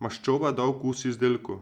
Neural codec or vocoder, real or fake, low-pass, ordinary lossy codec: vocoder, 44.1 kHz, 128 mel bands every 256 samples, BigVGAN v2; fake; 19.8 kHz; Opus, 64 kbps